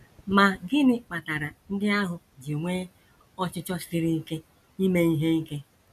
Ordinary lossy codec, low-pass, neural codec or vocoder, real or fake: none; 14.4 kHz; none; real